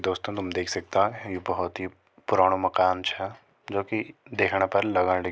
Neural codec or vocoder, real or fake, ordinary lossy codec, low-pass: none; real; none; none